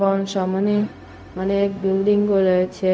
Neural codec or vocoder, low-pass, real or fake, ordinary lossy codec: codec, 16 kHz, 0.4 kbps, LongCat-Audio-Codec; none; fake; none